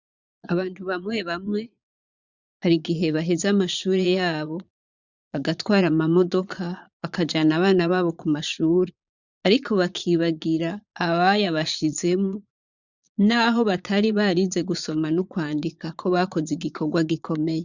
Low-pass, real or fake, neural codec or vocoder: 7.2 kHz; fake; vocoder, 22.05 kHz, 80 mel bands, Vocos